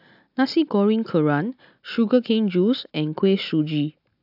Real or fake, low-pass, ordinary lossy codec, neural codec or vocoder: real; 5.4 kHz; AAC, 48 kbps; none